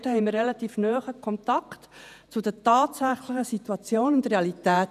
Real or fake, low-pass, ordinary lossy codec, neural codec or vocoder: fake; 14.4 kHz; none; vocoder, 48 kHz, 128 mel bands, Vocos